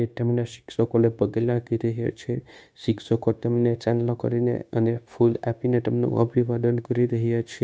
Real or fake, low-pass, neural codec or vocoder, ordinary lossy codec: fake; none; codec, 16 kHz, 0.9 kbps, LongCat-Audio-Codec; none